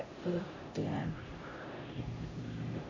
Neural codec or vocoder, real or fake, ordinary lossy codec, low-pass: codec, 16 kHz, 0.5 kbps, X-Codec, HuBERT features, trained on LibriSpeech; fake; MP3, 32 kbps; 7.2 kHz